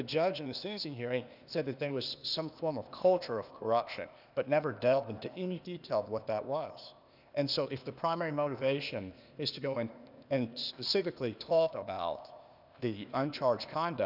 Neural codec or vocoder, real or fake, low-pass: codec, 16 kHz, 0.8 kbps, ZipCodec; fake; 5.4 kHz